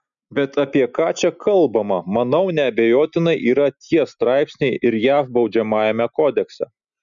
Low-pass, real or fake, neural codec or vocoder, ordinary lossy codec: 7.2 kHz; real; none; MP3, 96 kbps